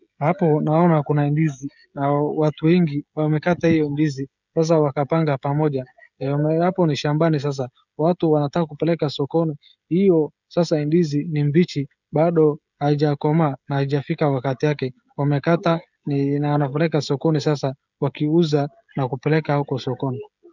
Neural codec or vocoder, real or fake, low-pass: codec, 16 kHz, 16 kbps, FreqCodec, smaller model; fake; 7.2 kHz